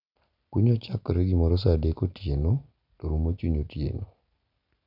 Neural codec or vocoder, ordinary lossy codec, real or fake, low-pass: none; none; real; 5.4 kHz